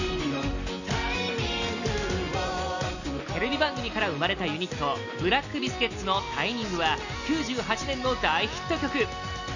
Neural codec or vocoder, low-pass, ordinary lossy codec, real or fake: none; 7.2 kHz; none; real